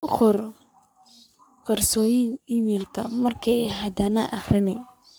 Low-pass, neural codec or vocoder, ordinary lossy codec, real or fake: none; codec, 44.1 kHz, 3.4 kbps, Pupu-Codec; none; fake